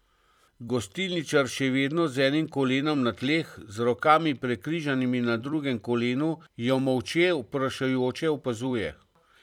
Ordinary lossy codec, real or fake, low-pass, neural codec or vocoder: none; fake; 19.8 kHz; vocoder, 44.1 kHz, 128 mel bands every 256 samples, BigVGAN v2